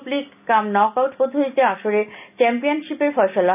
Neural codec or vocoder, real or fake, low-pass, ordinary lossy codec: none; real; 3.6 kHz; none